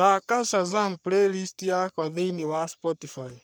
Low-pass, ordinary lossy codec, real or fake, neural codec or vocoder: none; none; fake; codec, 44.1 kHz, 3.4 kbps, Pupu-Codec